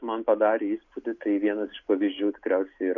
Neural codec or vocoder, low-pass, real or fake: none; 7.2 kHz; real